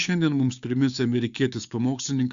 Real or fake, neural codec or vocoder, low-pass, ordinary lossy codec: fake; codec, 16 kHz, 6 kbps, DAC; 7.2 kHz; Opus, 64 kbps